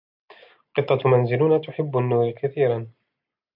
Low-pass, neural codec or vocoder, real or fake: 5.4 kHz; none; real